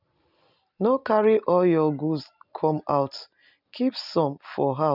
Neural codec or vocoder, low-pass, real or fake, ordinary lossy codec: none; 5.4 kHz; real; none